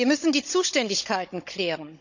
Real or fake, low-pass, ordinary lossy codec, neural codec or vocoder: fake; 7.2 kHz; none; codec, 16 kHz, 16 kbps, FunCodec, trained on Chinese and English, 50 frames a second